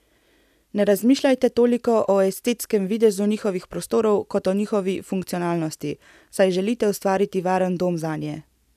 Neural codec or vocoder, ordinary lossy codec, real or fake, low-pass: none; none; real; 14.4 kHz